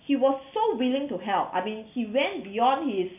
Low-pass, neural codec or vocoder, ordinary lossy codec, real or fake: 3.6 kHz; none; none; real